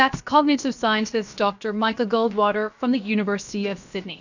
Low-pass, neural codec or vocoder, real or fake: 7.2 kHz; codec, 16 kHz, about 1 kbps, DyCAST, with the encoder's durations; fake